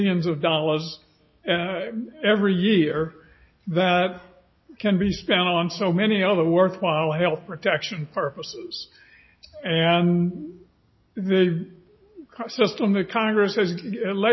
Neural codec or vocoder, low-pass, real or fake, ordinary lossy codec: none; 7.2 kHz; real; MP3, 24 kbps